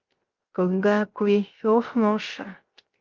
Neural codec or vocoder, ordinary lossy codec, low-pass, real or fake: codec, 16 kHz, 0.3 kbps, FocalCodec; Opus, 32 kbps; 7.2 kHz; fake